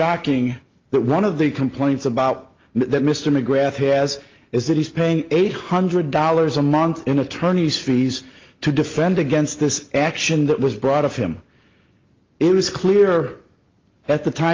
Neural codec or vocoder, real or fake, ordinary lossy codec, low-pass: none; real; Opus, 32 kbps; 7.2 kHz